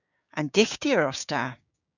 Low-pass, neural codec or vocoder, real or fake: 7.2 kHz; codec, 16 kHz, 6 kbps, DAC; fake